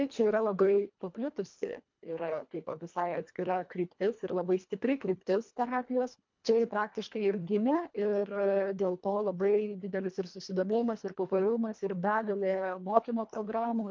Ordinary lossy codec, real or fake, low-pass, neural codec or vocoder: MP3, 64 kbps; fake; 7.2 kHz; codec, 24 kHz, 1.5 kbps, HILCodec